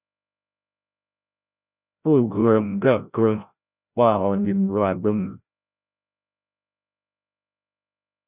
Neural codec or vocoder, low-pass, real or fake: codec, 16 kHz, 0.5 kbps, FreqCodec, larger model; 3.6 kHz; fake